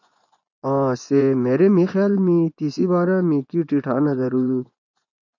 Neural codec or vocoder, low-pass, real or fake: vocoder, 24 kHz, 100 mel bands, Vocos; 7.2 kHz; fake